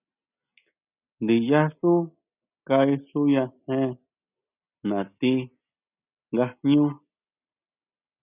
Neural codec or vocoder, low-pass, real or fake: none; 3.6 kHz; real